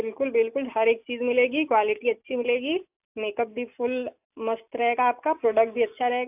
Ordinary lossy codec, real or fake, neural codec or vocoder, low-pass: AAC, 32 kbps; real; none; 3.6 kHz